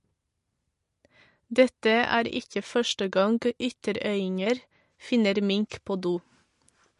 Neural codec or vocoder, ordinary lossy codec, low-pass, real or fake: none; MP3, 48 kbps; 14.4 kHz; real